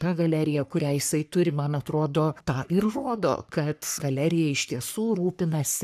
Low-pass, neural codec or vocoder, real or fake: 14.4 kHz; codec, 44.1 kHz, 3.4 kbps, Pupu-Codec; fake